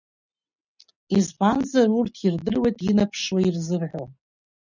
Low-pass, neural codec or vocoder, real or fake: 7.2 kHz; none; real